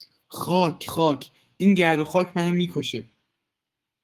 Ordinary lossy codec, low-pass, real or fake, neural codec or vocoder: Opus, 32 kbps; 14.4 kHz; fake; codec, 32 kHz, 1.9 kbps, SNAC